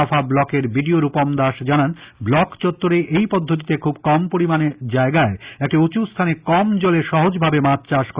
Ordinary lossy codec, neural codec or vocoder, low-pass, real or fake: Opus, 64 kbps; none; 3.6 kHz; real